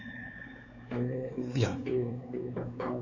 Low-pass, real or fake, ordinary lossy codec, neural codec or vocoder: 7.2 kHz; fake; none; codec, 16 kHz, 4 kbps, X-Codec, WavLM features, trained on Multilingual LibriSpeech